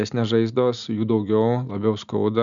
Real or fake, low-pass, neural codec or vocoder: real; 7.2 kHz; none